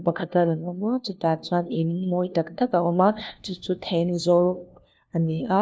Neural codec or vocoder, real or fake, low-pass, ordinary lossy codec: codec, 16 kHz, 1 kbps, FunCodec, trained on LibriTTS, 50 frames a second; fake; none; none